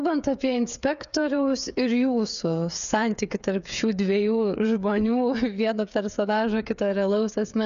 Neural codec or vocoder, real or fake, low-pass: codec, 16 kHz, 8 kbps, FreqCodec, smaller model; fake; 7.2 kHz